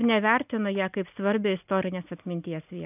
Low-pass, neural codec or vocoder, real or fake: 3.6 kHz; none; real